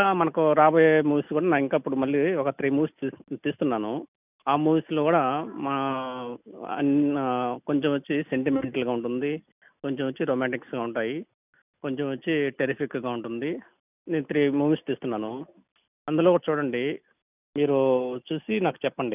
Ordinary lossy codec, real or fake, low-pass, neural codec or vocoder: none; real; 3.6 kHz; none